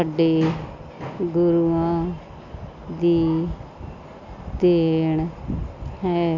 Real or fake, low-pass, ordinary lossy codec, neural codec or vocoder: real; 7.2 kHz; none; none